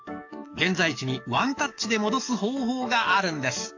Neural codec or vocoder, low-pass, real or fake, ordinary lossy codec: codec, 44.1 kHz, 7.8 kbps, DAC; 7.2 kHz; fake; AAC, 48 kbps